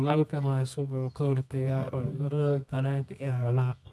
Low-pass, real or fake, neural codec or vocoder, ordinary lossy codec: none; fake; codec, 24 kHz, 0.9 kbps, WavTokenizer, medium music audio release; none